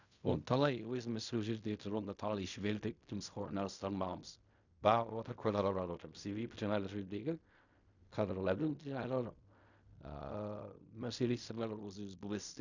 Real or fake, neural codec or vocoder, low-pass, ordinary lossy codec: fake; codec, 16 kHz in and 24 kHz out, 0.4 kbps, LongCat-Audio-Codec, fine tuned four codebook decoder; 7.2 kHz; none